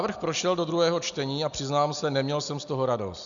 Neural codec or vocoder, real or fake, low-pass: none; real; 7.2 kHz